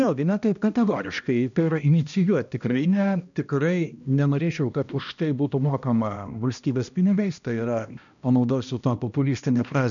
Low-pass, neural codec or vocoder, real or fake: 7.2 kHz; codec, 16 kHz, 1 kbps, X-Codec, HuBERT features, trained on balanced general audio; fake